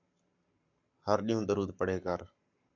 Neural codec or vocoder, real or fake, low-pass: codec, 44.1 kHz, 7.8 kbps, Pupu-Codec; fake; 7.2 kHz